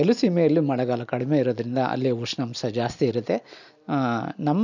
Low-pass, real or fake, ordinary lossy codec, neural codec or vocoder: 7.2 kHz; real; none; none